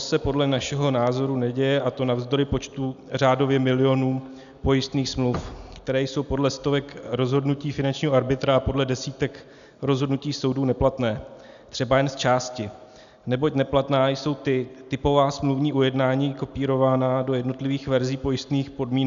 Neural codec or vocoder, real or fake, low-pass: none; real; 7.2 kHz